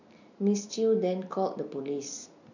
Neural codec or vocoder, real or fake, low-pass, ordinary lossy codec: none; real; 7.2 kHz; none